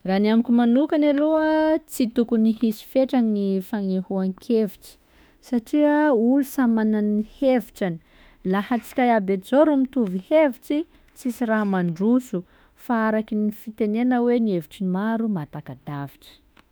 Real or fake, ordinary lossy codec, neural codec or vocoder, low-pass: fake; none; autoencoder, 48 kHz, 32 numbers a frame, DAC-VAE, trained on Japanese speech; none